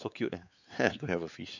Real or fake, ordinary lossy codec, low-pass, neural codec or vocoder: fake; none; 7.2 kHz; codec, 16 kHz, 4 kbps, X-Codec, WavLM features, trained on Multilingual LibriSpeech